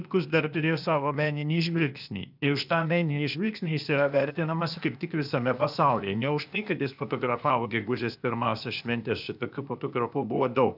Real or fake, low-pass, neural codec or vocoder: fake; 5.4 kHz; codec, 16 kHz, 0.8 kbps, ZipCodec